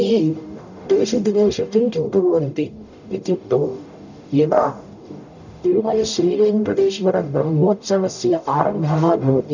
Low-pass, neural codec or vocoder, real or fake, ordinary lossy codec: 7.2 kHz; codec, 44.1 kHz, 0.9 kbps, DAC; fake; none